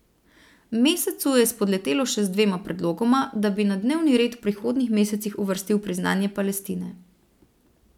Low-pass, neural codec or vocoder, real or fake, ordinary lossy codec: 19.8 kHz; none; real; none